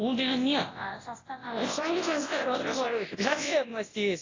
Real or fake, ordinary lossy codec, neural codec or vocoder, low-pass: fake; AAC, 32 kbps; codec, 24 kHz, 0.9 kbps, WavTokenizer, large speech release; 7.2 kHz